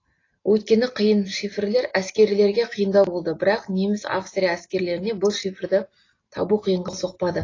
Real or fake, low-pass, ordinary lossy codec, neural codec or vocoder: real; 7.2 kHz; AAC, 32 kbps; none